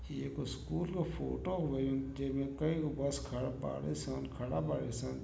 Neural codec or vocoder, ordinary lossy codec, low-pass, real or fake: none; none; none; real